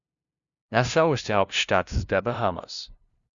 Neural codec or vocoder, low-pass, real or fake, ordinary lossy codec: codec, 16 kHz, 0.5 kbps, FunCodec, trained on LibriTTS, 25 frames a second; 7.2 kHz; fake; Opus, 64 kbps